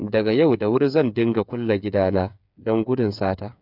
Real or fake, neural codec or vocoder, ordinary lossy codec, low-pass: fake; codec, 16 kHz, 8 kbps, FreqCodec, smaller model; none; 5.4 kHz